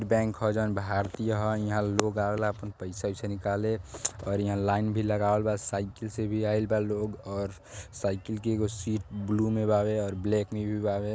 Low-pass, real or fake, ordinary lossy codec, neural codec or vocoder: none; real; none; none